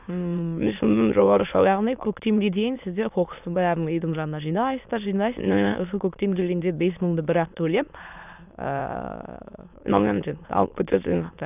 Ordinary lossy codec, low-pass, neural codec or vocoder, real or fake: none; 3.6 kHz; autoencoder, 22.05 kHz, a latent of 192 numbers a frame, VITS, trained on many speakers; fake